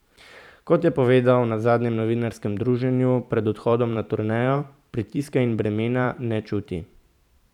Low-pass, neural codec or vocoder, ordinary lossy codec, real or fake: 19.8 kHz; vocoder, 44.1 kHz, 128 mel bands every 512 samples, BigVGAN v2; none; fake